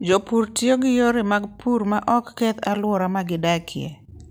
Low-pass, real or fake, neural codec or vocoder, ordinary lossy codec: none; real; none; none